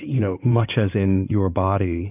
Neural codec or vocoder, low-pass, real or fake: codec, 16 kHz in and 24 kHz out, 2.2 kbps, FireRedTTS-2 codec; 3.6 kHz; fake